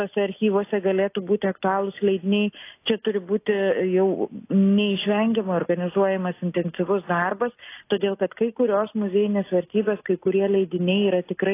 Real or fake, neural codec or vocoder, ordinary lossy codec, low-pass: real; none; AAC, 24 kbps; 3.6 kHz